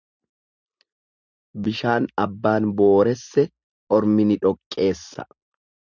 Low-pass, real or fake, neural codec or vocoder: 7.2 kHz; real; none